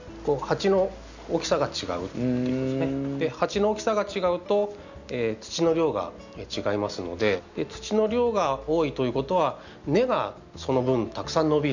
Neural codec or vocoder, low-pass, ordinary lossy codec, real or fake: none; 7.2 kHz; none; real